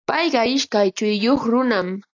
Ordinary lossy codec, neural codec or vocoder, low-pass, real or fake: AAC, 48 kbps; none; 7.2 kHz; real